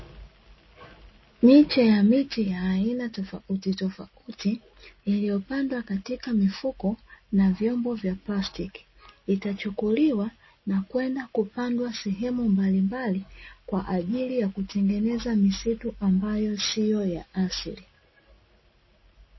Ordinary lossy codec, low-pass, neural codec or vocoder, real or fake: MP3, 24 kbps; 7.2 kHz; none; real